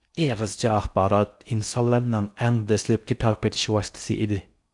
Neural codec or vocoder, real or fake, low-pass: codec, 16 kHz in and 24 kHz out, 0.6 kbps, FocalCodec, streaming, 4096 codes; fake; 10.8 kHz